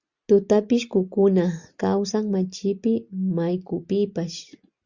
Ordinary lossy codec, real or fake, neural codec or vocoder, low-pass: Opus, 64 kbps; real; none; 7.2 kHz